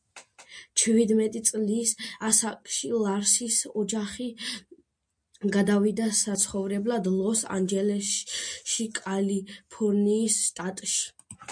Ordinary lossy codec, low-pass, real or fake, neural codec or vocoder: MP3, 64 kbps; 9.9 kHz; real; none